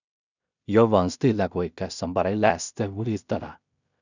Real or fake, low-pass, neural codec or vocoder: fake; 7.2 kHz; codec, 16 kHz in and 24 kHz out, 0.4 kbps, LongCat-Audio-Codec, two codebook decoder